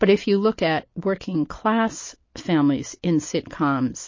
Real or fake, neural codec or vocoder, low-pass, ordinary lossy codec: real; none; 7.2 kHz; MP3, 32 kbps